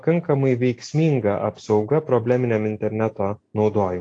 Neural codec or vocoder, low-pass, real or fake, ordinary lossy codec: none; 9.9 kHz; real; AAC, 48 kbps